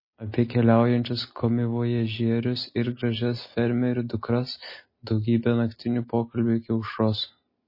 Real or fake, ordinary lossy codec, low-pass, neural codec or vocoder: real; MP3, 24 kbps; 5.4 kHz; none